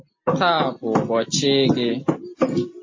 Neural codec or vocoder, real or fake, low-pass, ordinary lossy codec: none; real; 7.2 kHz; MP3, 32 kbps